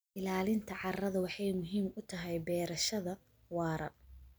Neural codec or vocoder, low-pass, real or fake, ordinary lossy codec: none; none; real; none